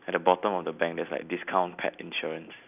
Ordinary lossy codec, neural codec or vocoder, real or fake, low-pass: none; none; real; 3.6 kHz